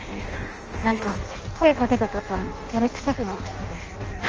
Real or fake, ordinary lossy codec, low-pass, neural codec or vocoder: fake; Opus, 24 kbps; 7.2 kHz; codec, 16 kHz in and 24 kHz out, 0.6 kbps, FireRedTTS-2 codec